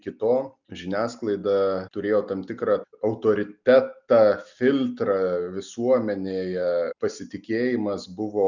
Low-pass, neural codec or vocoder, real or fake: 7.2 kHz; none; real